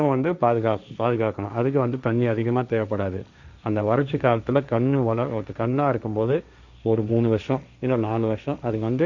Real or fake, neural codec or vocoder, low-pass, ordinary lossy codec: fake; codec, 16 kHz, 1.1 kbps, Voila-Tokenizer; 7.2 kHz; none